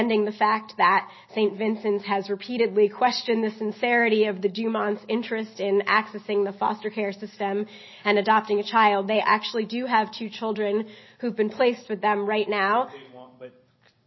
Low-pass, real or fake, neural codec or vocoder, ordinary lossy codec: 7.2 kHz; real; none; MP3, 24 kbps